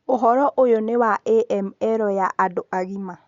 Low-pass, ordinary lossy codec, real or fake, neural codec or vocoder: 7.2 kHz; Opus, 64 kbps; real; none